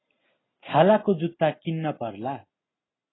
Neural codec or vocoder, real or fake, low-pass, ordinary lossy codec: none; real; 7.2 kHz; AAC, 16 kbps